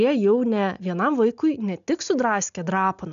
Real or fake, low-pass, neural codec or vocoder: real; 7.2 kHz; none